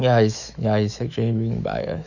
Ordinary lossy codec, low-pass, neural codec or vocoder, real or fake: none; 7.2 kHz; autoencoder, 48 kHz, 128 numbers a frame, DAC-VAE, trained on Japanese speech; fake